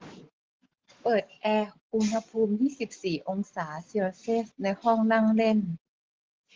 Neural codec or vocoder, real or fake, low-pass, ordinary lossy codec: none; real; 7.2 kHz; Opus, 16 kbps